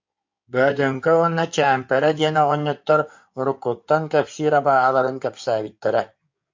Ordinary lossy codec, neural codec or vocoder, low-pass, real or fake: MP3, 48 kbps; codec, 16 kHz in and 24 kHz out, 2.2 kbps, FireRedTTS-2 codec; 7.2 kHz; fake